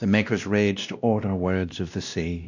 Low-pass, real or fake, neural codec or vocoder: 7.2 kHz; fake; codec, 16 kHz, 1 kbps, X-Codec, WavLM features, trained on Multilingual LibriSpeech